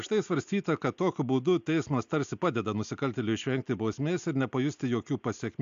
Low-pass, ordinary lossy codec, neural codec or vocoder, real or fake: 7.2 kHz; AAC, 64 kbps; none; real